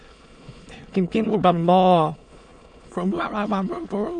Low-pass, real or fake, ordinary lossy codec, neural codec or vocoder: 9.9 kHz; fake; MP3, 64 kbps; autoencoder, 22.05 kHz, a latent of 192 numbers a frame, VITS, trained on many speakers